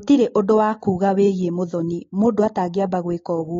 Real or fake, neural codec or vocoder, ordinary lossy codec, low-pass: real; none; AAC, 32 kbps; 7.2 kHz